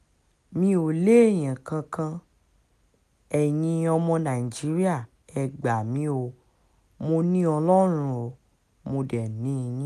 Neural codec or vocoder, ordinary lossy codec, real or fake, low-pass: none; none; real; 14.4 kHz